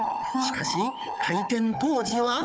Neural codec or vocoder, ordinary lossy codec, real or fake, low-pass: codec, 16 kHz, 4 kbps, FunCodec, trained on Chinese and English, 50 frames a second; none; fake; none